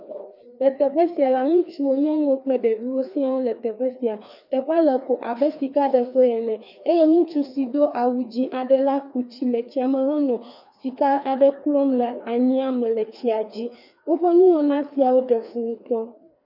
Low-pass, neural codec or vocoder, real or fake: 5.4 kHz; codec, 16 kHz, 2 kbps, FreqCodec, larger model; fake